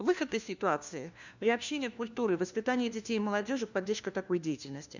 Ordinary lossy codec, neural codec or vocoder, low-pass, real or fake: none; codec, 16 kHz, 1 kbps, FunCodec, trained on LibriTTS, 50 frames a second; 7.2 kHz; fake